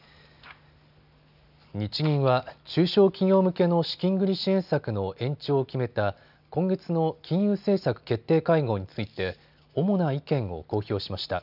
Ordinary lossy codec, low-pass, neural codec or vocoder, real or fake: none; 5.4 kHz; none; real